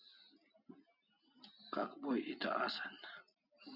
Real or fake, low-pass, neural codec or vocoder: real; 5.4 kHz; none